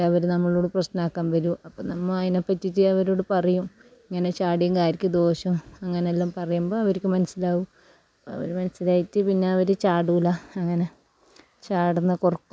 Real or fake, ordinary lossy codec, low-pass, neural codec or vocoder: real; none; none; none